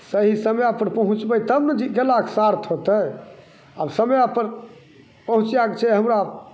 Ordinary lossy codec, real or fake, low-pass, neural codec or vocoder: none; real; none; none